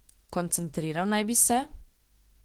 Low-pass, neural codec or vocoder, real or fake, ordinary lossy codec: 19.8 kHz; autoencoder, 48 kHz, 32 numbers a frame, DAC-VAE, trained on Japanese speech; fake; Opus, 16 kbps